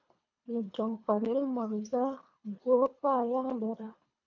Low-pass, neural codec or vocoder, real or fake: 7.2 kHz; codec, 24 kHz, 3 kbps, HILCodec; fake